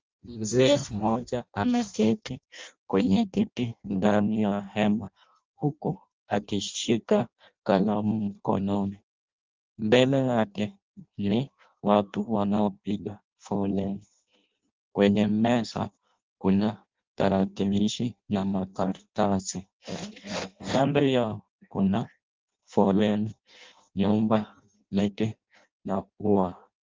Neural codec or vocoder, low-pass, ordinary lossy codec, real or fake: codec, 16 kHz in and 24 kHz out, 0.6 kbps, FireRedTTS-2 codec; 7.2 kHz; Opus, 32 kbps; fake